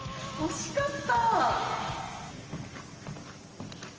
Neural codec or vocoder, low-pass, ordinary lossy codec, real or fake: none; 7.2 kHz; Opus, 24 kbps; real